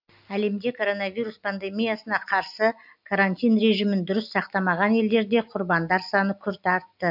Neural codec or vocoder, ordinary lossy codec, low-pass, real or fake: none; AAC, 48 kbps; 5.4 kHz; real